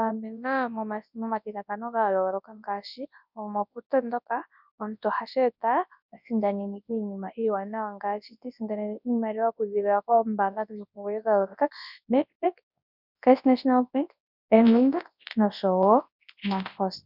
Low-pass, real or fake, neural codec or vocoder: 5.4 kHz; fake; codec, 24 kHz, 0.9 kbps, WavTokenizer, large speech release